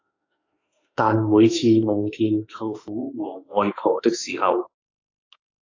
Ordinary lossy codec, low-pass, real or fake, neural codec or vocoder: AAC, 48 kbps; 7.2 kHz; fake; autoencoder, 48 kHz, 32 numbers a frame, DAC-VAE, trained on Japanese speech